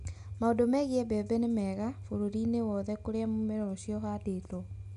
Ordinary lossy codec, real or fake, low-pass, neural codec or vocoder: none; real; 10.8 kHz; none